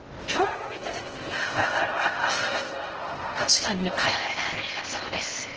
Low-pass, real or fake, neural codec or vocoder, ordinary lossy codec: 7.2 kHz; fake; codec, 16 kHz in and 24 kHz out, 0.6 kbps, FocalCodec, streaming, 2048 codes; Opus, 16 kbps